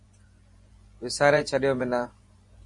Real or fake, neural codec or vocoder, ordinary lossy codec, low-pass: fake; vocoder, 24 kHz, 100 mel bands, Vocos; MP3, 48 kbps; 10.8 kHz